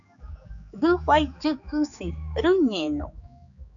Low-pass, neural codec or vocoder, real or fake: 7.2 kHz; codec, 16 kHz, 4 kbps, X-Codec, HuBERT features, trained on general audio; fake